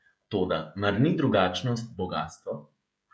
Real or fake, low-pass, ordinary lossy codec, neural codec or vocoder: fake; none; none; codec, 16 kHz, 16 kbps, FreqCodec, smaller model